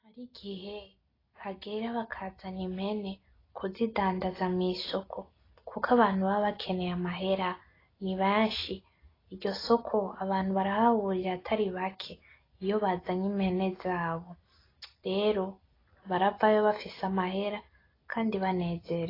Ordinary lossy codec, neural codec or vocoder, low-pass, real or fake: AAC, 24 kbps; none; 5.4 kHz; real